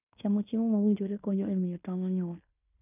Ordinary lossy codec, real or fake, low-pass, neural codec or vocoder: none; fake; 3.6 kHz; codec, 16 kHz in and 24 kHz out, 0.9 kbps, LongCat-Audio-Codec, fine tuned four codebook decoder